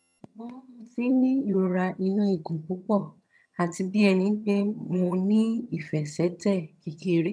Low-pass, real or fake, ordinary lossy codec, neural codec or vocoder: none; fake; none; vocoder, 22.05 kHz, 80 mel bands, HiFi-GAN